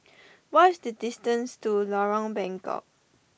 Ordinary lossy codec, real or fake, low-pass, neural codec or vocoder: none; real; none; none